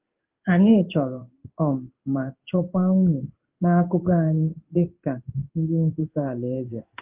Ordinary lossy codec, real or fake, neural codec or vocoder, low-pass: Opus, 32 kbps; fake; codec, 16 kHz in and 24 kHz out, 1 kbps, XY-Tokenizer; 3.6 kHz